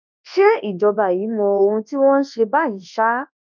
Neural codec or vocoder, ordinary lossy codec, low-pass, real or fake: codec, 24 kHz, 0.9 kbps, WavTokenizer, large speech release; none; 7.2 kHz; fake